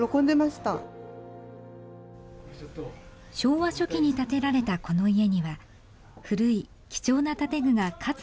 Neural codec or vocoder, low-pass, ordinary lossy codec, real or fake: none; none; none; real